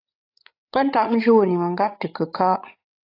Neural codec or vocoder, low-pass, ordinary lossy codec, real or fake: codec, 16 kHz, 16 kbps, FreqCodec, larger model; 5.4 kHz; AAC, 32 kbps; fake